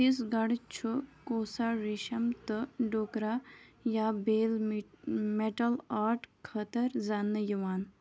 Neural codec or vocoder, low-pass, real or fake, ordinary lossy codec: none; none; real; none